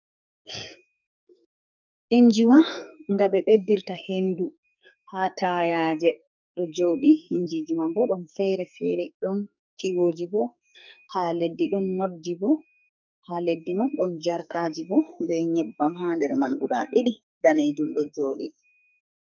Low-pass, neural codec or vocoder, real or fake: 7.2 kHz; codec, 44.1 kHz, 2.6 kbps, SNAC; fake